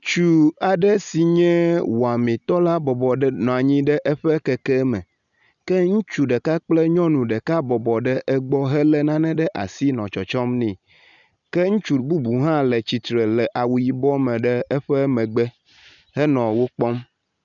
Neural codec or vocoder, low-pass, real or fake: none; 7.2 kHz; real